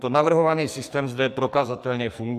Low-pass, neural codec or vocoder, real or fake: 14.4 kHz; codec, 44.1 kHz, 2.6 kbps, SNAC; fake